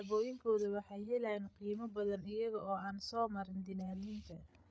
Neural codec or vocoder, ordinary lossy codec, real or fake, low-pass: codec, 16 kHz, 8 kbps, FreqCodec, larger model; none; fake; none